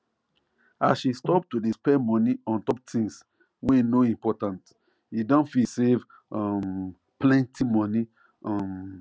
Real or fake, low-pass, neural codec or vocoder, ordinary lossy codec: real; none; none; none